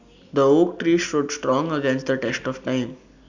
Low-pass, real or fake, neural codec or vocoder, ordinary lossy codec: 7.2 kHz; real; none; none